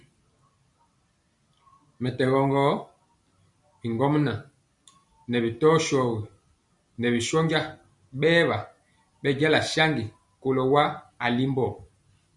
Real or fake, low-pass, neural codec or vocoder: real; 10.8 kHz; none